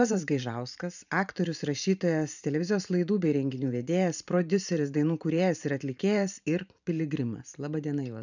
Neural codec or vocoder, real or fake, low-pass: vocoder, 44.1 kHz, 128 mel bands every 512 samples, BigVGAN v2; fake; 7.2 kHz